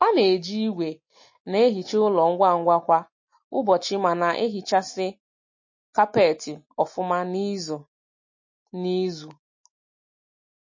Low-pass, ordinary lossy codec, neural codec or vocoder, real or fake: 7.2 kHz; MP3, 32 kbps; none; real